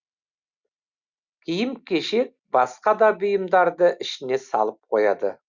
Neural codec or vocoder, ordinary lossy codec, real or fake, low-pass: none; Opus, 64 kbps; real; 7.2 kHz